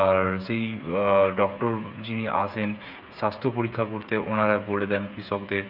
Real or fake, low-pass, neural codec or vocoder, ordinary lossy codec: fake; 5.4 kHz; codec, 16 kHz, 8 kbps, FreqCodec, smaller model; none